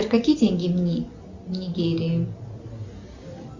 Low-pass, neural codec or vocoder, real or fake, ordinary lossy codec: 7.2 kHz; none; real; Opus, 64 kbps